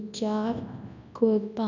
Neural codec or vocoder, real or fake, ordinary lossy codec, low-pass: codec, 24 kHz, 0.9 kbps, WavTokenizer, large speech release; fake; none; 7.2 kHz